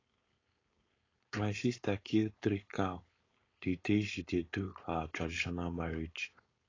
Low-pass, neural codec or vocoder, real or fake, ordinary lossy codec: 7.2 kHz; codec, 16 kHz, 4.8 kbps, FACodec; fake; AAC, 32 kbps